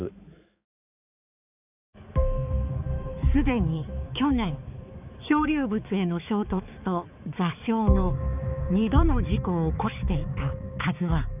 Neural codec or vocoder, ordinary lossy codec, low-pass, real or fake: codec, 16 kHz, 4 kbps, X-Codec, HuBERT features, trained on balanced general audio; none; 3.6 kHz; fake